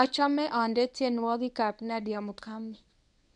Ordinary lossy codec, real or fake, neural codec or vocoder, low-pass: none; fake; codec, 24 kHz, 0.9 kbps, WavTokenizer, medium speech release version 1; 10.8 kHz